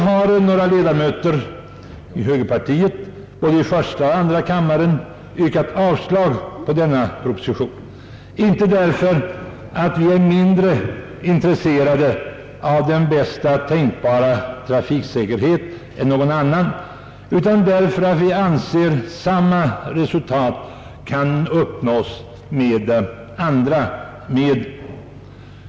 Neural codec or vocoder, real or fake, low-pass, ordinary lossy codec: none; real; none; none